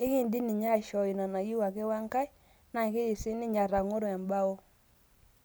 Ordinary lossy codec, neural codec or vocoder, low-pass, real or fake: none; none; none; real